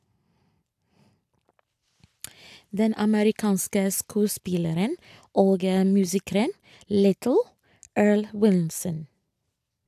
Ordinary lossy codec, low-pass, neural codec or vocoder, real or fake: AAC, 96 kbps; 14.4 kHz; none; real